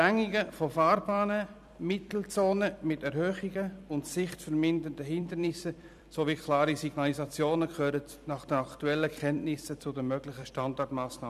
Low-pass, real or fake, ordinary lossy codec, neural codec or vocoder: 14.4 kHz; fake; none; vocoder, 44.1 kHz, 128 mel bands every 256 samples, BigVGAN v2